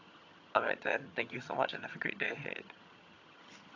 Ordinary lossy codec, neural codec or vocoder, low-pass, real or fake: AAC, 48 kbps; vocoder, 22.05 kHz, 80 mel bands, HiFi-GAN; 7.2 kHz; fake